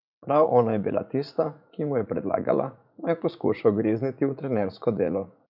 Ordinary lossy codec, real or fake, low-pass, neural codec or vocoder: none; fake; 5.4 kHz; vocoder, 44.1 kHz, 80 mel bands, Vocos